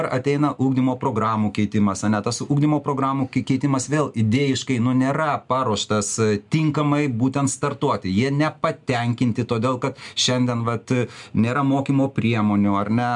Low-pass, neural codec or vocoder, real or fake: 10.8 kHz; none; real